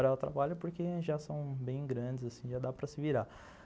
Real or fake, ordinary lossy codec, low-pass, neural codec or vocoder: real; none; none; none